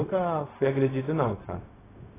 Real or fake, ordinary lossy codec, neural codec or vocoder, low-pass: fake; AAC, 16 kbps; codec, 16 kHz, 0.4 kbps, LongCat-Audio-Codec; 3.6 kHz